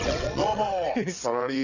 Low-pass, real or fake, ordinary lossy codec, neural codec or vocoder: 7.2 kHz; fake; none; vocoder, 22.05 kHz, 80 mel bands, WaveNeXt